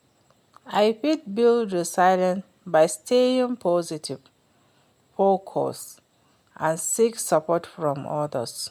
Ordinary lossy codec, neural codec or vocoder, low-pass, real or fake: MP3, 96 kbps; none; 19.8 kHz; real